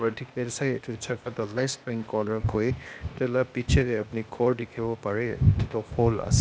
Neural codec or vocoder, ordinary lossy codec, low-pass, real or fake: codec, 16 kHz, 0.8 kbps, ZipCodec; none; none; fake